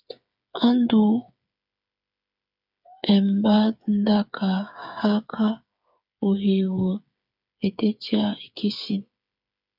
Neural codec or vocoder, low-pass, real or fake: codec, 16 kHz, 8 kbps, FreqCodec, smaller model; 5.4 kHz; fake